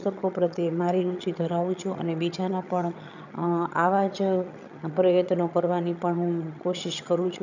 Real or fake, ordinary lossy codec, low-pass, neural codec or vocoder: fake; none; 7.2 kHz; vocoder, 22.05 kHz, 80 mel bands, HiFi-GAN